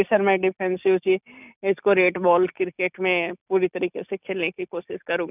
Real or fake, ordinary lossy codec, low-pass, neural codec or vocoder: real; none; 3.6 kHz; none